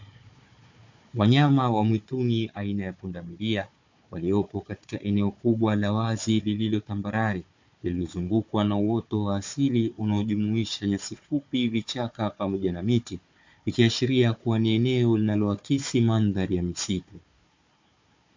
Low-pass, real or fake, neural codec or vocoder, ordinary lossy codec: 7.2 kHz; fake; codec, 16 kHz, 4 kbps, FunCodec, trained on Chinese and English, 50 frames a second; MP3, 48 kbps